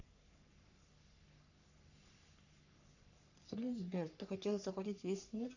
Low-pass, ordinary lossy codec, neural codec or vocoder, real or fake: 7.2 kHz; none; codec, 44.1 kHz, 3.4 kbps, Pupu-Codec; fake